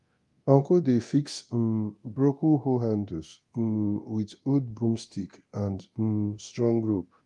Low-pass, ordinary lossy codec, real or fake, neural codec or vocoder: 10.8 kHz; Opus, 32 kbps; fake; codec, 24 kHz, 0.9 kbps, DualCodec